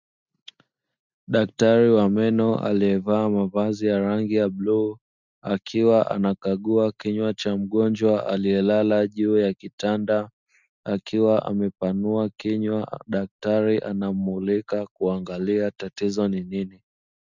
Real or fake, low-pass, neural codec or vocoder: real; 7.2 kHz; none